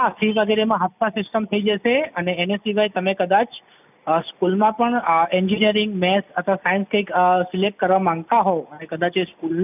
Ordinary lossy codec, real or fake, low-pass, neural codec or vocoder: none; real; 3.6 kHz; none